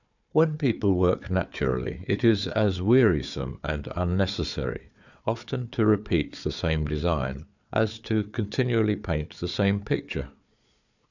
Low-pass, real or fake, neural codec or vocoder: 7.2 kHz; fake; codec, 16 kHz, 4 kbps, FunCodec, trained on Chinese and English, 50 frames a second